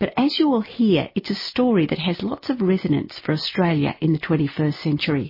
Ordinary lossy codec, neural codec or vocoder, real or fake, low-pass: MP3, 24 kbps; none; real; 5.4 kHz